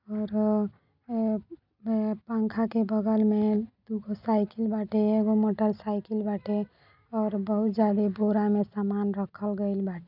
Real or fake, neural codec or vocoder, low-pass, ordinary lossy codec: real; none; 5.4 kHz; none